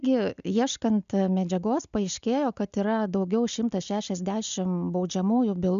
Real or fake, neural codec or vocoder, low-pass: real; none; 7.2 kHz